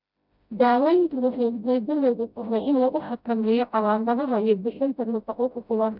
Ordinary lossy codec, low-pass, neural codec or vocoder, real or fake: none; 5.4 kHz; codec, 16 kHz, 0.5 kbps, FreqCodec, smaller model; fake